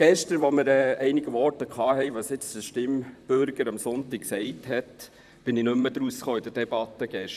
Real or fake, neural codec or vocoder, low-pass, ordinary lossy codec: fake; vocoder, 44.1 kHz, 128 mel bands, Pupu-Vocoder; 14.4 kHz; none